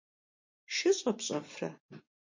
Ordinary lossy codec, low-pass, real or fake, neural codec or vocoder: MP3, 48 kbps; 7.2 kHz; real; none